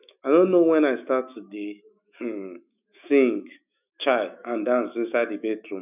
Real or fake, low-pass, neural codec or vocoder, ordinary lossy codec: fake; 3.6 kHz; autoencoder, 48 kHz, 128 numbers a frame, DAC-VAE, trained on Japanese speech; none